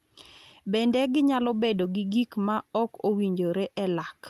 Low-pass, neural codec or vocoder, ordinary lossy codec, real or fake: 14.4 kHz; none; Opus, 32 kbps; real